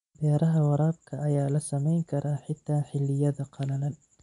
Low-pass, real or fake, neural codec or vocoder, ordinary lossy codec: 10.8 kHz; real; none; none